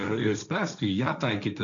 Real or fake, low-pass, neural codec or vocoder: fake; 7.2 kHz; codec, 16 kHz, 1.1 kbps, Voila-Tokenizer